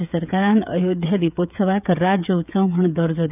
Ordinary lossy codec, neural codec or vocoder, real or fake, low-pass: none; codec, 16 kHz, 16 kbps, FreqCodec, smaller model; fake; 3.6 kHz